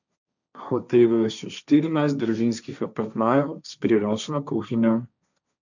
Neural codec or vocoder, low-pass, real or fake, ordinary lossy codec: codec, 16 kHz, 1.1 kbps, Voila-Tokenizer; 7.2 kHz; fake; none